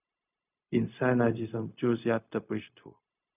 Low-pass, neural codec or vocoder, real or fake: 3.6 kHz; codec, 16 kHz, 0.4 kbps, LongCat-Audio-Codec; fake